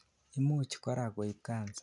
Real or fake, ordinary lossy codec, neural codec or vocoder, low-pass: real; none; none; 10.8 kHz